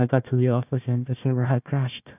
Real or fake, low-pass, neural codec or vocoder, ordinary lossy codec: fake; 3.6 kHz; codec, 16 kHz, 1 kbps, FunCodec, trained on Chinese and English, 50 frames a second; AAC, 32 kbps